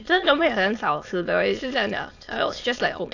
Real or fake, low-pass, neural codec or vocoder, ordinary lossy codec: fake; 7.2 kHz; autoencoder, 22.05 kHz, a latent of 192 numbers a frame, VITS, trained on many speakers; AAC, 48 kbps